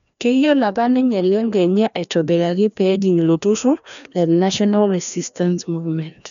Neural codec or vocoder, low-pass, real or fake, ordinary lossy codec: codec, 16 kHz, 1 kbps, FreqCodec, larger model; 7.2 kHz; fake; none